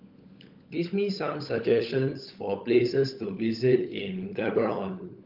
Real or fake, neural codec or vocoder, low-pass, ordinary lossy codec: fake; codec, 16 kHz, 16 kbps, FunCodec, trained on LibriTTS, 50 frames a second; 5.4 kHz; Opus, 24 kbps